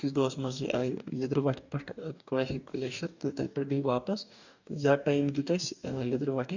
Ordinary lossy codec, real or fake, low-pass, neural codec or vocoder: none; fake; 7.2 kHz; codec, 44.1 kHz, 2.6 kbps, DAC